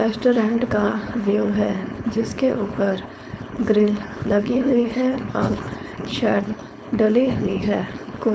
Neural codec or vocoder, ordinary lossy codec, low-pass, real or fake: codec, 16 kHz, 4.8 kbps, FACodec; none; none; fake